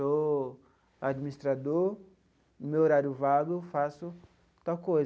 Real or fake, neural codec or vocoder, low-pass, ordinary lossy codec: real; none; none; none